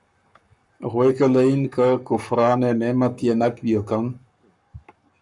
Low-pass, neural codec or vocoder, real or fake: 10.8 kHz; codec, 44.1 kHz, 7.8 kbps, Pupu-Codec; fake